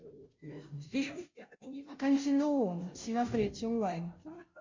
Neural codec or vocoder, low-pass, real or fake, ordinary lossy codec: codec, 16 kHz, 0.5 kbps, FunCodec, trained on Chinese and English, 25 frames a second; 7.2 kHz; fake; MP3, 48 kbps